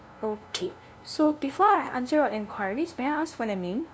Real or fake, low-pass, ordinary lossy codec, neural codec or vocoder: fake; none; none; codec, 16 kHz, 0.5 kbps, FunCodec, trained on LibriTTS, 25 frames a second